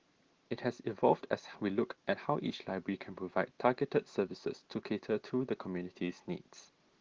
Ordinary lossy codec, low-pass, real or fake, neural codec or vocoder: Opus, 16 kbps; 7.2 kHz; real; none